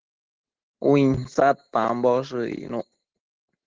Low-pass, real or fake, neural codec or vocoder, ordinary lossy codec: 7.2 kHz; real; none; Opus, 16 kbps